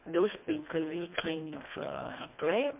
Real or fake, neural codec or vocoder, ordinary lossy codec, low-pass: fake; codec, 24 kHz, 1.5 kbps, HILCodec; MP3, 32 kbps; 3.6 kHz